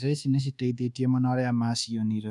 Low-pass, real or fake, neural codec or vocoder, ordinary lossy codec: none; fake; codec, 24 kHz, 1.2 kbps, DualCodec; none